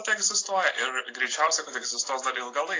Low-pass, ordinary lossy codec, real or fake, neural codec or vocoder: 7.2 kHz; AAC, 32 kbps; real; none